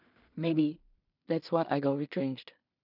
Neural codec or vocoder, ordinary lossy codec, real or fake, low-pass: codec, 16 kHz in and 24 kHz out, 0.4 kbps, LongCat-Audio-Codec, two codebook decoder; none; fake; 5.4 kHz